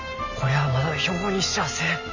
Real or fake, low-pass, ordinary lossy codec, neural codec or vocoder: real; 7.2 kHz; none; none